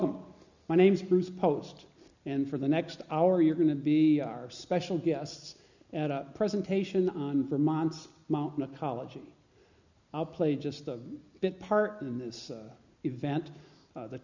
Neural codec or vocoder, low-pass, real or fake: none; 7.2 kHz; real